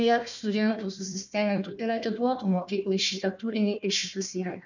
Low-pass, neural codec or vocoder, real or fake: 7.2 kHz; codec, 16 kHz, 1 kbps, FunCodec, trained on Chinese and English, 50 frames a second; fake